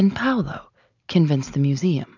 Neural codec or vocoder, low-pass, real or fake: none; 7.2 kHz; real